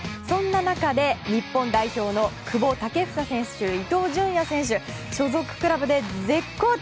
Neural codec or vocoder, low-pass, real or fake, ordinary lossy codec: none; none; real; none